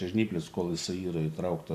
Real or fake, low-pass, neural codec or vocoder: fake; 14.4 kHz; vocoder, 44.1 kHz, 128 mel bands every 512 samples, BigVGAN v2